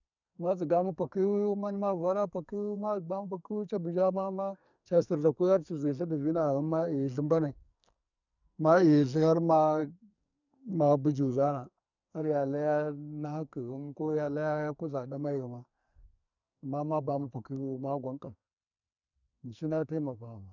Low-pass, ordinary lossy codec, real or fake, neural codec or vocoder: 7.2 kHz; none; fake; codec, 44.1 kHz, 2.6 kbps, SNAC